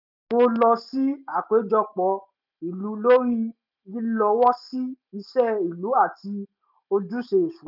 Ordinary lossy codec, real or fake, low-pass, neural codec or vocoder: none; real; 5.4 kHz; none